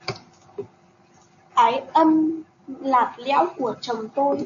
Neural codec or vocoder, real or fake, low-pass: none; real; 7.2 kHz